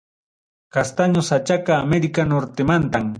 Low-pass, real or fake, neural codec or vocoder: 9.9 kHz; real; none